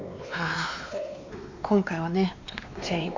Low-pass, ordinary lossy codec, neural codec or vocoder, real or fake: 7.2 kHz; MP3, 64 kbps; codec, 16 kHz, 2 kbps, X-Codec, WavLM features, trained on Multilingual LibriSpeech; fake